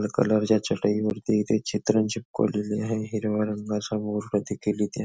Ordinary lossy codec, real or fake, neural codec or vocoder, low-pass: none; real; none; none